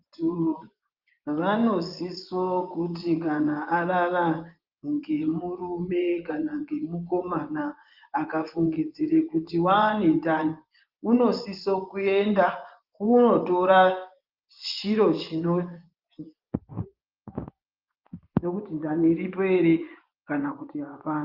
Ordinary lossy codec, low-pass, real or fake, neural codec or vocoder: Opus, 32 kbps; 5.4 kHz; fake; vocoder, 24 kHz, 100 mel bands, Vocos